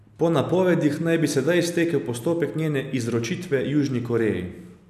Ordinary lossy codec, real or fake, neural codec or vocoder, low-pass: none; real; none; 14.4 kHz